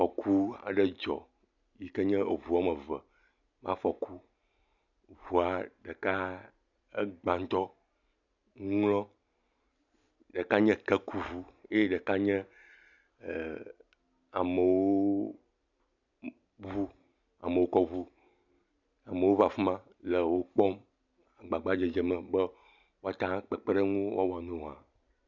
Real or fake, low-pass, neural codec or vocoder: real; 7.2 kHz; none